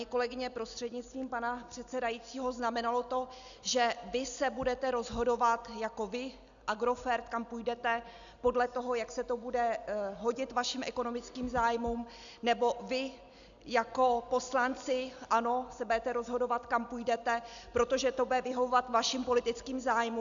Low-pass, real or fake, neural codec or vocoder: 7.2 kHz; real; none